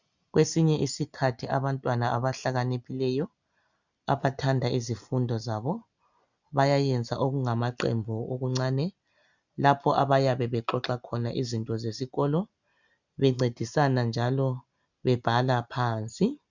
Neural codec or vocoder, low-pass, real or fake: none; 7.2 kHz; real